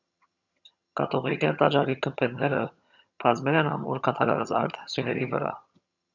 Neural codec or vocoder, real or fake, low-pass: vocoder, 22.05 kHz, 80 mel bands, HiFi-GAN; fake; 7.2 kHz